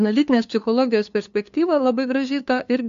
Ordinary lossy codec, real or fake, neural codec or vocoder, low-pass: AAC, 64 kbps; fake; codec, 16 kHz, 2 kbps, FunCodec, trained on LibriTTS, 25 frames a second; 7.2 kHz